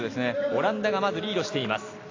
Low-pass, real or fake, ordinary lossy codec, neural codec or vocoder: 7.2 kHz; real; AAC, 32 kbps; none